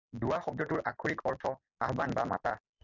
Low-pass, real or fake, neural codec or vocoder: 7.2 kHz; real; none